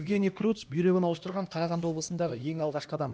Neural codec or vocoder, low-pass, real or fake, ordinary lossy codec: codec, 16 kHz, 1 kbps, X-Codec, HuBERT features, trained on LibriSpeech; none; fake; none